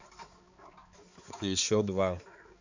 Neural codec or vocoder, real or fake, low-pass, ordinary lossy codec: codec, 16 kHz, 4 kbps, X-Codec, HuBERT features, trained on balanced general audio; fake; 7.2 kHz; Opus, 64 kbps